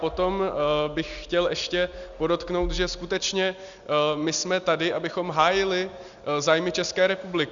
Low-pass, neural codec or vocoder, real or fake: 7.2 kHz; none; real